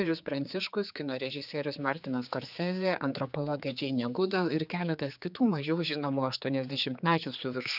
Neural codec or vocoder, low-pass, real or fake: codec, 16 kHz, 4 kbps, X-Codec, HuBERT features, trained on general audio; 5.4 kHz; fake